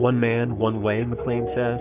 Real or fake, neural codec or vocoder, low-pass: fake; codec, 44.1 kHz, 3.4 kbps, Pupu-Codec; 3.6 kHz